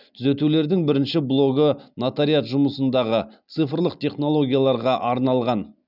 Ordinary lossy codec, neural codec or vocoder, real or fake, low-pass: none; none; real; 5.4 kHz